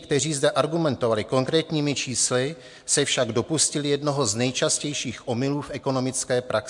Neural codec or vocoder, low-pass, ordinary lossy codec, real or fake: none; 10.8 kHz; MP3, 64 kbps; real